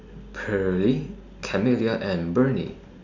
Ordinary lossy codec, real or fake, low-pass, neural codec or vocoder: none; real; 7.2 kHz; none